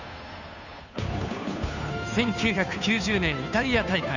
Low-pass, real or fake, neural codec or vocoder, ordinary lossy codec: 7.2 kHz; fake; codec, 16 kHz, 2 kbps, FunCodec, trained on Chinese and English, 25 frames a second; none